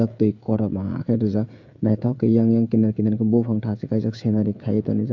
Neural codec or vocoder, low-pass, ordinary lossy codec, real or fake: codec, 16 kHz, 16 kbps, FreqCodec, smaller model; 7.2 kHz; AAC, 48 kbps; fake